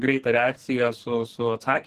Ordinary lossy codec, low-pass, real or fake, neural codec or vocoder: Opus, 16 kbps; 14.4 kHz; fake; codec, 44.1 kHz, 2.6 kbps, SNAC